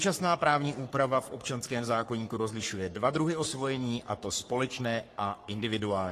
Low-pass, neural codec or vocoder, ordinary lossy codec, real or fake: 14.4 kHz; codec, 44.1 kHz, 3.4 kbps, Pupu-Codec; AAC, 48 kbps; fake